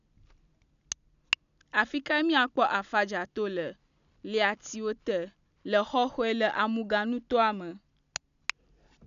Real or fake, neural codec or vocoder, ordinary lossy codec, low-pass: real; none; none; 7.2 kHz